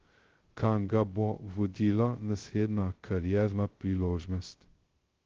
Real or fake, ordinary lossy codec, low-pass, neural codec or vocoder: fake; Opus, 16 kbps; 7.2 kHz; codec, 16 kHz, 0.2 kbps, FocalCodec